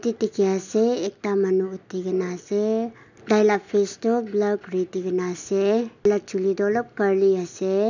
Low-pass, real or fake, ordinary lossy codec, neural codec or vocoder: 7.2 kHz; real; none; none